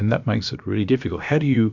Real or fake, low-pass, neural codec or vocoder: fake; 7.2 kHz; codec, 16 kHz, about 1 kbps, DyCAST, with the encoder's durations